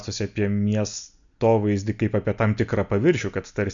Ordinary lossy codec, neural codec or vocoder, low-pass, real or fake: MP3, 96 kbps; none; 7.2 kHz; real